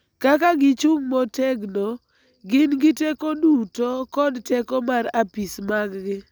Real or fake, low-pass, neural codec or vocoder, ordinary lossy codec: real; none; none; none